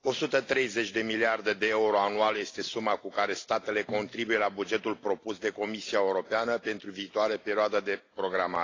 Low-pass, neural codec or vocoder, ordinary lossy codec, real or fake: 7.2 kHz; none; AAC, 32 kbps; real